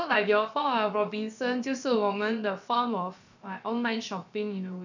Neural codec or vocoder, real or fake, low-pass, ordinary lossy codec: codec, 16 kHz, about 1 kbps, DyCAST, with the encoder's durations; fake; 7.2 kHz; none